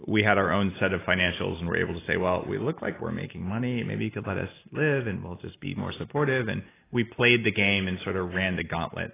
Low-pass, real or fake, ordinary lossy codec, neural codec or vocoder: 3.6 kHz; real; AAC, 16 kbps; none